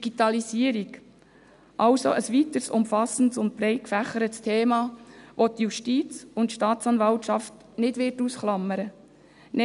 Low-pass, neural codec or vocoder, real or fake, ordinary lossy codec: 10.8 kHz; none; real; none